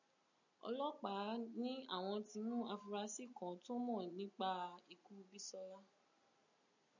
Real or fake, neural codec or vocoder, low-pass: real; none; 7.2 kHz